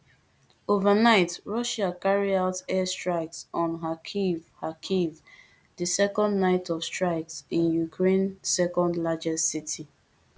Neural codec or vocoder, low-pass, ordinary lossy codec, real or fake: none; none; none; real